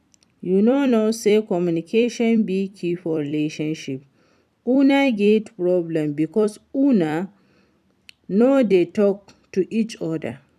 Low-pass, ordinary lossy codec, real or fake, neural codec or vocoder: 14.4 kHz; none; fake; vocoder, 44.1 kHz, 128 mel bands every 256 samples, BigVGAN v2